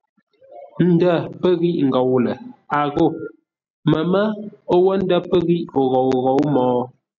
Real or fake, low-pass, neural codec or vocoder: real; 7.2 kHz; none